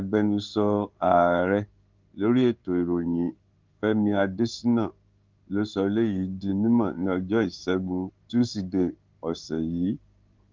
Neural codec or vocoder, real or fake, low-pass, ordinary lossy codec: codec, 16 kHz in and 24 kHz out, 1 kbps, XY-Tokenizer; fake; 7.2 kHz; Opus, 32 kbps